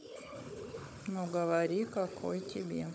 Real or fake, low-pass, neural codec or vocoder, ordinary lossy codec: fake; none; codec, 16 kHz, 16 kbps, FunCodec, trained on Chinese and English, 50 frames a second; none